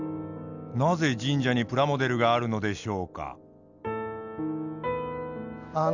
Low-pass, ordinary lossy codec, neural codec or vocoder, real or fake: 7.2 kHz; none; none; real